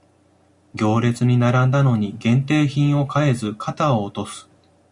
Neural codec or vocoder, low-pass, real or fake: none; 10.8 kHz; real